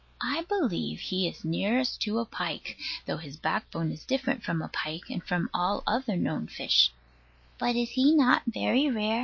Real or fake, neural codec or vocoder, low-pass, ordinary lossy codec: real; none; 7.2 kHz; MP3, 32 kbps